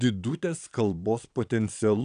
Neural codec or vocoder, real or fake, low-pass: vocoder, 22.05 kHz, 80 mel bands, Vocos; fake; 9.9 kHz